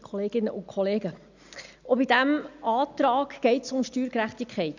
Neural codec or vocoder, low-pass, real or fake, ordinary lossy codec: none; 7.2 kHz; real; none